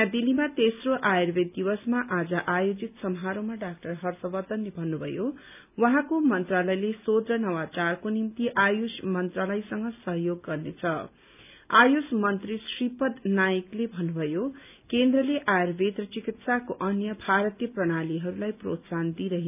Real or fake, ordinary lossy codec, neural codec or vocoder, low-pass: real; none; none; 3.6 kHz